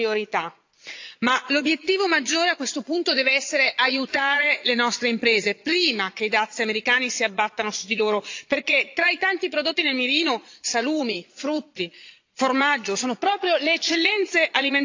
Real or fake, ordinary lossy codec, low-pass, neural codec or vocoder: fake; AAC, 48 kbps; 7.2 kHz; vocoder, 22.05 kHz, 80 mel bands, Vocos